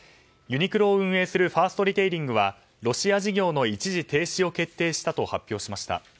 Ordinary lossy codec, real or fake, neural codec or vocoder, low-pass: none; real; none; none